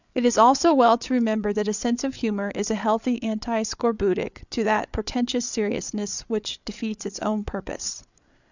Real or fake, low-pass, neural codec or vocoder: fake; 7.2 kHz; codec, 16 kHz, 8 kbps, FreqCodec, larger model